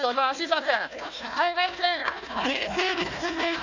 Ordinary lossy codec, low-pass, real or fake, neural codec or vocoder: none; 7.2 kHz; fake; codec, 16 kHz, 1 kbps, FunCodec, trained on Chinese and English, 50 frames a second